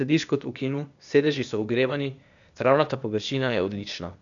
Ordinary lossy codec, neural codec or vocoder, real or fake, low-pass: none; codec, 16 kHz, 0.8 kbps, ZipCodec; fake; 7.2 kHz